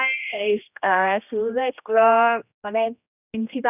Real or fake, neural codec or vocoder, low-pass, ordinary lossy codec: fake; codec, 16 kHz, 1 kbps, X-Codec, HuBERT features, trained on general audio; 3.6 kHz; none